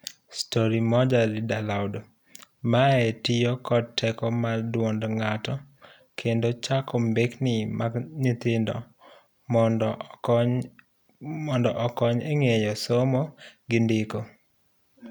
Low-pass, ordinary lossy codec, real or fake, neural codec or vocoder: 19.8 kHz; none; real; none